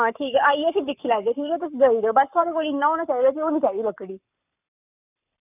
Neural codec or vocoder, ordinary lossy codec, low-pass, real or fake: codec, 44.1 kHz, 7.8 kbps, Pupu-Codec; none; 3.6 kHz; fake